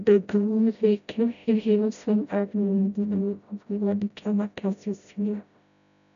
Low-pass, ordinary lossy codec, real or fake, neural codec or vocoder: 7.2 kHz; none; fake; codec, 16 kHz, 0.5 kbps, FreqCodec, smaller model